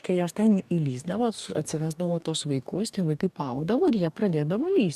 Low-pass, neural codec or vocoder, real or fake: 14.4 kHz; codec, 44.1 kHz, 2.6 kbps, DAC; fake